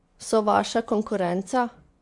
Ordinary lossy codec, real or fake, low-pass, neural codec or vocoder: MP3, 64 kbps; fake; 10.8 kHz; vocoder, 24 kHz, 100 mel bands, Vocos